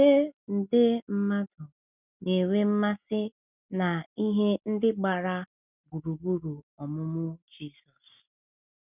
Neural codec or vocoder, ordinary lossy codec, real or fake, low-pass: none; none; real; 3.6 kHz